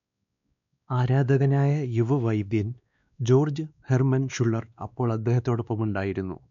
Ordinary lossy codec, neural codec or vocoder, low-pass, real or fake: MP3, 96 kbps; codec, 16 kHz, 2 kbps, X-Codec, WavLM features, trained on Multilingual LibriSpeech; 7.2 kHz; fake